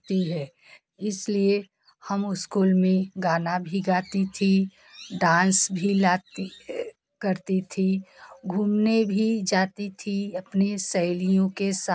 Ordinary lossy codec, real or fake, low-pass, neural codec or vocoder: none; real; none; none